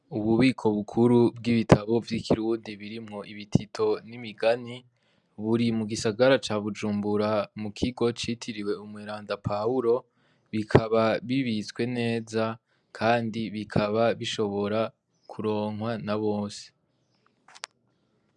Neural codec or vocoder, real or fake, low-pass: none; real; 10.8 kHz